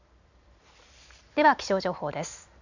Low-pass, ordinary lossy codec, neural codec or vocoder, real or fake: 7.2 kHz; none; none; real